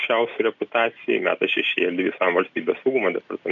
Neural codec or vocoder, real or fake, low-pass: none; real; 7.2 kHz